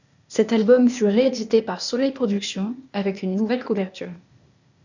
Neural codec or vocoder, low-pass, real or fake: codec, 16 kHz, 0.8 kbps, ZipCodec; 7.2 kHz; fake